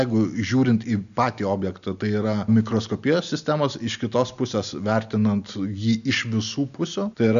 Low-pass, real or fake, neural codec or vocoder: 7.2 kHz; real; none